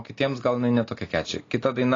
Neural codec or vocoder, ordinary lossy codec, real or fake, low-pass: none; AAC, 32 kbps; real; 7.2 kHz